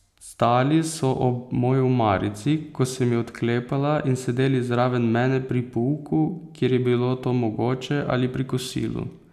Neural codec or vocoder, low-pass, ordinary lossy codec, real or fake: none; 14.4 kHz; none; real